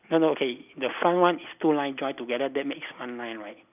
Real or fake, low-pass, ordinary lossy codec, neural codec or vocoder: real; 3.6 kHz; none; none